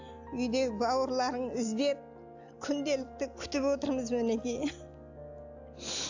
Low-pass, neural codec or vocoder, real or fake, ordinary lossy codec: 7.2 kHz; none; real; AAC, 48 kbps